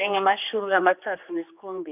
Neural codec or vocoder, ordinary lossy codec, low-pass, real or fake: codec, 16 kHz, 2 kbps, X-Codec, HuBERT features, trained on general audio; none; 3.6 kHz; fake